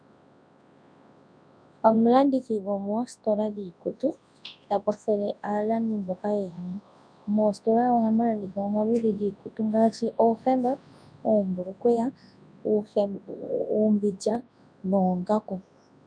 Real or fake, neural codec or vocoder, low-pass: fake; codec, 24 kHz, 0.9 kbps, WavTokenizer, large speech release; 9.9 kHz